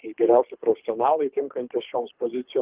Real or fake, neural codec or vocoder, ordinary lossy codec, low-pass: fake; codec, 24 kHz, 3 kbps, HILCodec; Opus, 32 kbps; 3.6 kHz